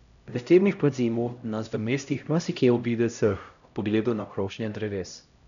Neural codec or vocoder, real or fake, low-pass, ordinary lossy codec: codec, 16 kHz, 0.5 kbps, X-Codec, HuBERT features, trained on LibriSpeech; fake; 7.2 kHz; none